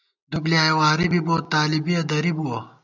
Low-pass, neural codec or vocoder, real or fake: 7.2 kHz; none; real